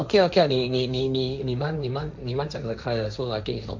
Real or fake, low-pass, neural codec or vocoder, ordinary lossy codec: fake; 7.2 kHz; codec, 16 kHz, 1.1 kbps, Voila-Tokenizer; MP3, 64 kbps